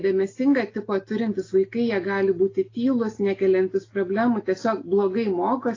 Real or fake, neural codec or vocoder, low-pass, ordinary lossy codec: real; none; 7.2 kHz; AAC, 32 kbps